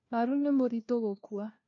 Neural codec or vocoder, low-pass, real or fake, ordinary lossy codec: codec, 16 kHz, 1 kbps, FunCodec, trained on LibriTTS, 50 frames a second; 7.2 kHz; fake; MP3, 48 kbps